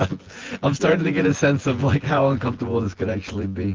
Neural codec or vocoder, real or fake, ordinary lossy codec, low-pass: vocoder, 24 kHz, 100 mel bands, Vocos; fake; Opus, 16 kbps; 7.2 kHz